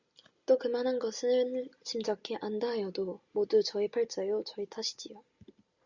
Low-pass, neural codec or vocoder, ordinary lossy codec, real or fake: 7.2 kHz; none; Opus, 64 kbps; real